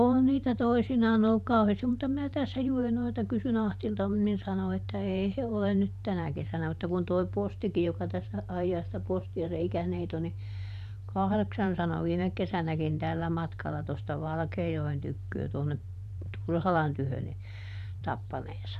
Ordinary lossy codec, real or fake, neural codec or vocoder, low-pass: none; fake; vocoder, 44.1 kHz, 128 mel bands every 512 samples, BigVGAN v2; 14.4 kHz